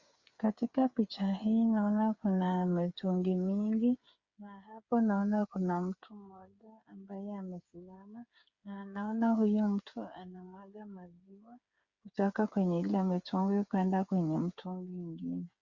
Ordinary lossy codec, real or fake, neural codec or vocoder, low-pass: Opus, 64 kbps; fake; codec, 16 kHz, 8 kbps, FreqCodec, smaller model; 7.2 kHz